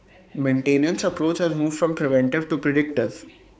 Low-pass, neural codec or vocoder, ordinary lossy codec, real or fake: none; codec, 16 kHz, 4 kbps, X-Codec, HuBERT features, trained on balanced general audio; none; fake